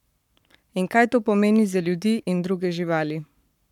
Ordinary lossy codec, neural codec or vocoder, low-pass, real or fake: none; codec, 44.1 kHz, 7.8 kbps, Pupu-Codec; 19.8 kHz; fake